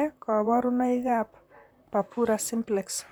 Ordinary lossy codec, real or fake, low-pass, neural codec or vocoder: none; fake; none; vocoder, 44.1 kHz, 128 mel bands, Pupu-Vocoder